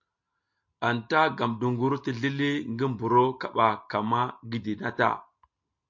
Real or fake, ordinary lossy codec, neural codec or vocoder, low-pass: real; MP3, 48 kbps; none; 7.2 kHz